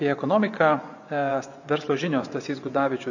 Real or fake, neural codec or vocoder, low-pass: fake; vocoder, 24 kHz, 100 mel bands, Vocos; 7.2 kHz